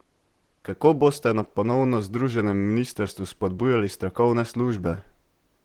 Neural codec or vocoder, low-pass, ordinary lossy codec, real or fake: vocoder, 44.1 kHz, 128 mel bands, Pupu-Vocoder; 19.8 kHz; Opus, 16 kbps; fake